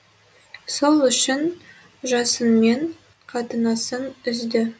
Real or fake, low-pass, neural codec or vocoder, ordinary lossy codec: real; none; none; none